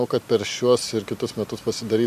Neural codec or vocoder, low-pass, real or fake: none; 14.4 kHz; real